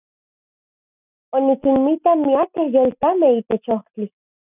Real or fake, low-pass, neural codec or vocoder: real; 3.6 kHz; none